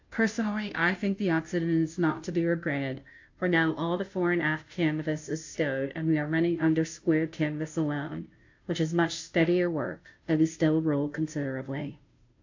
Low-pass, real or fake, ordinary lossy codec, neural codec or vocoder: 7.2 kHz; fake; AAC, 48 kbps; codec, 16 kHz, 0.5 kbps, FunCodec, trained on Chinese and English, 25 frames a second